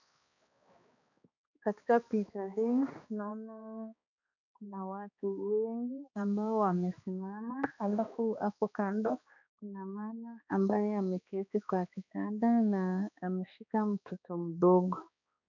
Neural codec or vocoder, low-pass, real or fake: codec, 16 kHz, 2 kbps, X-Codec, HuBERT features, trained on balanced general audio; 7.2 kHz; fake